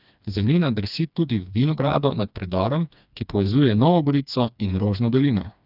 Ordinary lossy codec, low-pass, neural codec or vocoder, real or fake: none; 5.4 kHz; codec, 16 kHz, 2 kbps, FreqCodec, smaller model; fake